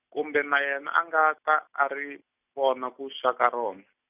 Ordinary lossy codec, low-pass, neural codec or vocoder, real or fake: none; 3.6 kHz; none; real